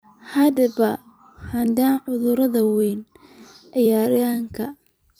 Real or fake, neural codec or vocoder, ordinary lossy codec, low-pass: fake; vocoder, 44.1 kHz, 128 mel bands every 256 samples, BigVGAN v2; none; none